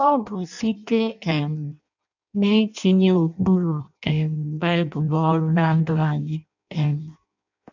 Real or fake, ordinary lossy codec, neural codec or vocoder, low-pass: fake; none; codec, 16 kHz in and 24 kHz out, 0.6 kbps, FireRedTTS-2 codec; 7.2 kHz